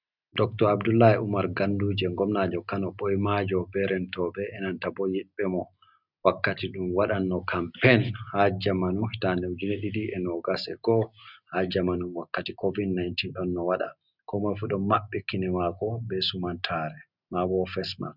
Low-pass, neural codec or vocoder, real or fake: 5.4 kHz; none; real